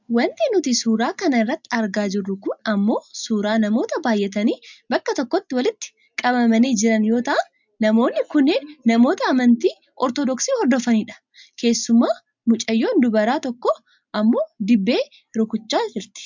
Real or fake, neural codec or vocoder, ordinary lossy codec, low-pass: real; none; MP3, 64 kbps; 7.2 kHz